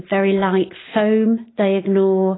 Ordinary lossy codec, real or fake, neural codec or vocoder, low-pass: AAC, 16 kbps; real; none; 7.2 kHz